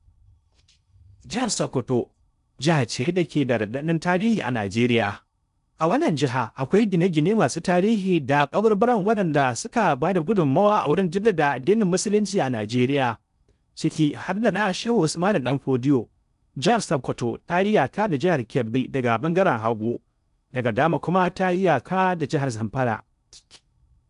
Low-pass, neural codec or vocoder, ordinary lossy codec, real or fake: 10.8 kHz; codec, 16 kHz in and 24 kHz out, 0.6 kbps, FocalCodec, streaming, 4096 codes; none; fake